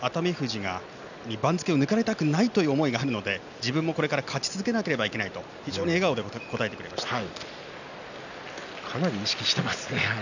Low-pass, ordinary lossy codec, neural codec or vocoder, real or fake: 7.2 kHz; none; none; real